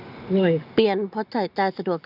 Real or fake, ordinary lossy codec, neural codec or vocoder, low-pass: real; none; none; 5.4 kHz